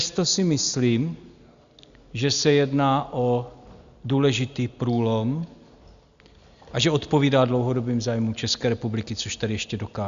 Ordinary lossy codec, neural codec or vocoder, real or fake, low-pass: Opus, 64 kbps; none; real; 7.2 kHz